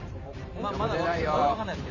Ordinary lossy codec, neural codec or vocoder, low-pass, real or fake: none; vocoder, 44.1 kHz, 128 mel bands every 512 samples, BigVGAN v2; 7.2 kHz; fake